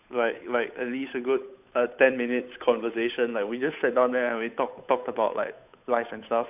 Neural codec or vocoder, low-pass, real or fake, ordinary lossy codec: codec, 16 kHz, 8 kbps, FunCodec, trained on Chinese and English, 25 frames a second; 3.6 kHz; fake; none